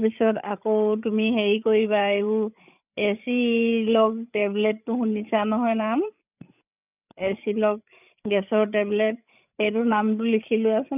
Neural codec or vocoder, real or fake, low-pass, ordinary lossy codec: codec, 16 kHz, 8 kbps, FreqCodec, larger model; fake; 3.6 kHz; none